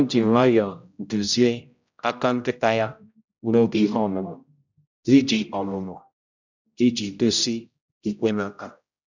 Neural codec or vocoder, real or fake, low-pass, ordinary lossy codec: codec, 16 kHz, 0.5 kbps, X-Codec, HuBERT features, trained on general audio; fake; 7.2 kHz; none